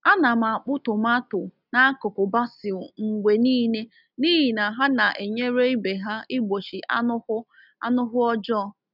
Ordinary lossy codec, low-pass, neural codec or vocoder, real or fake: none; 5.4 kHz; none; real